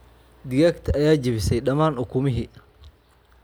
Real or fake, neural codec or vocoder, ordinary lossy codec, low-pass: real; none; none; none